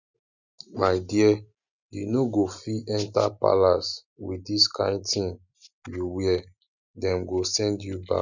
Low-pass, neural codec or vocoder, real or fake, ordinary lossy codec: 7.2 kHz; none; real; none